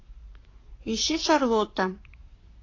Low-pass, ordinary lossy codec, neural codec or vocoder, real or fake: 7.2 kHz; AAC, 32 kbps; codec, 44.1 kHz, 7.8 kbps, Pupu-Codec; fake